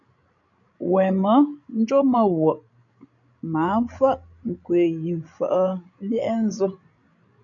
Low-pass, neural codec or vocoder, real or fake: 7.2 kHz; codec, 16 kHz, 16 kbps, FreqCodec, larger model; fake